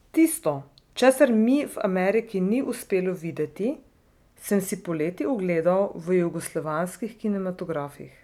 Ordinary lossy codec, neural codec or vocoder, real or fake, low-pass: none; none; real; 19.8 kHz